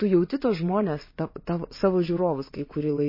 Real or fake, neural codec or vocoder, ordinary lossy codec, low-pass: fake; vocoder, 22.05 kHz, 80 mel bands, WaveNeXt; MP3, 24 kbps; 5.4 kHz